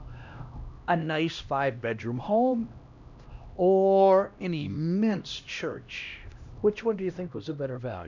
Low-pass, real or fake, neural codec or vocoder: 7.2 kHz; fake; codec, 16 kHz, 1 kbps, X-Codec, HuBERT features, trained on LibriSpeech